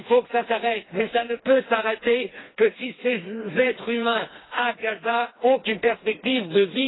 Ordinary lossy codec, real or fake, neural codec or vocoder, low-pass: AAC, 16 kbps; fake; codec, 16 kHz, 2 kbps, FreqCodec, smaller model; 7.2 kHz